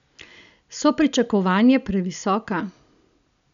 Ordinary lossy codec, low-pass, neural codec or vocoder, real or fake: none; 7.2 kHz; none; real